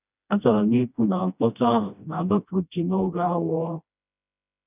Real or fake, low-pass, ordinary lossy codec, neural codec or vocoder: fake; 3.6 kHz; none; codec, 16 kHz, 1 kbps, FreqCodec, smaller model